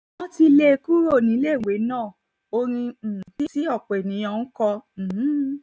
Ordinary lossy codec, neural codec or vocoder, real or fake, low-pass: none; none; real; none